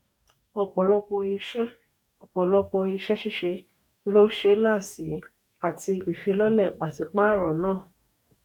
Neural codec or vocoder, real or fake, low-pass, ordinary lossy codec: codec, 44.1 kHz, 2.6 kbps, DAC; fake; 19.8 kHz; none